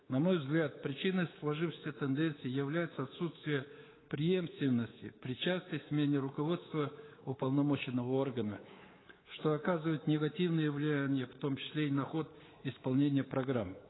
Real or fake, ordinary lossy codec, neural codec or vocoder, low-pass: fake; AAC, 16 kbps; codec, 24 kHz, 3.1 kbps, DualCodec; 7.2 kHz